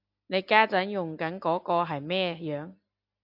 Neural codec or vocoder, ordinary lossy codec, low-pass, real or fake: none; AAC, 48 kbps; 5.4 kHz; real